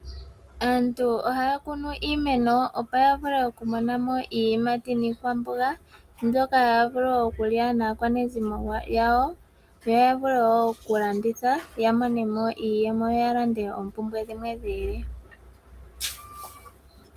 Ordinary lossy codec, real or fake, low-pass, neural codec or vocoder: Opus, 32 kbps; real; 14.4 kHz; none